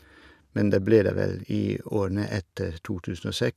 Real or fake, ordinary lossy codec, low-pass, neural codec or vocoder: real; none; 14.4 kHz; none